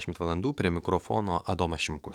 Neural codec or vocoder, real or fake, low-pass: codec, 44.1 kHz, 7.8 kbps, DAC; fake; 19.8 kHz